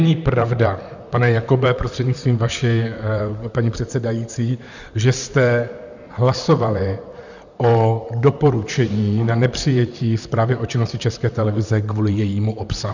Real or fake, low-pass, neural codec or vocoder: fake; 7.2 kHz; vocoder, 44.1 kHz, 128 mel bands, Pupu-Vocoder